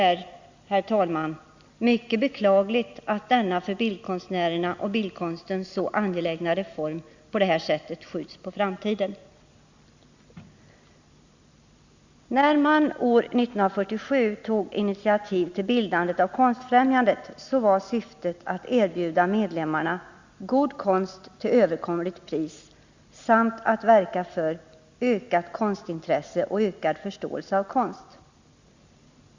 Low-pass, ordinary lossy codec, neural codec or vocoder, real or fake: 7.2 kHz; Opus, 64 kbps; none; real